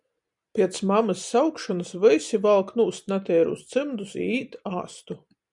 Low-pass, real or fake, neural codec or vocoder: 10.8 kHz; real; none